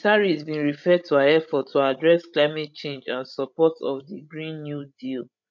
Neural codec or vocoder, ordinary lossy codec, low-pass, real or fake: codec, 16 kHz, 16 kbps, FreqCodec, larger model; none; 7.2 kHz; fake